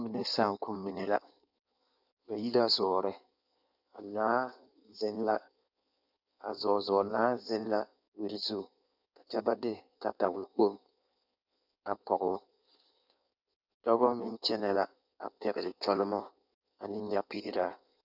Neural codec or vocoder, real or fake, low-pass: codec, 16 kHz in and 24 kHz out, 1.1 kbps, FireRedTTS-2 codec; fake; 5.4 kHz